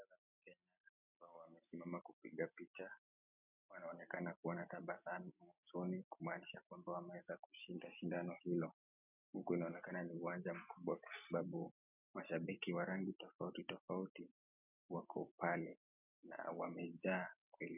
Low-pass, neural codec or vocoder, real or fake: 3.6 kHz; none; real